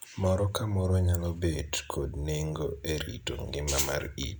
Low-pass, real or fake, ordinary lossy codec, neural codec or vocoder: none; real; none; none